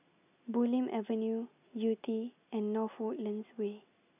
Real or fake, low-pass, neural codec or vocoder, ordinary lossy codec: real; 3.6 kHz; none; none